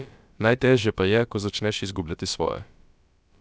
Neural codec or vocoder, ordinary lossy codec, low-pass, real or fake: codec, 16 kHz, about 1 kbps, DyCAST, with the encoder's durations; none; none; fake